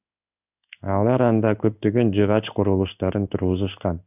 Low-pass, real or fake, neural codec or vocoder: 3.6 kHz; fake; codec, 16 kHz in and 24 kHz out, 1 kbps, XY-Tokenizer